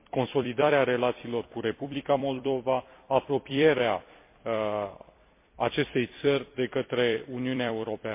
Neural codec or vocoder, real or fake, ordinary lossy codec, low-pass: vocoder, 44.1 kHz, 128 mel bands every 256 samples, BigVGAN v2; fake; MP3, 24 kbps; 3.6 kHz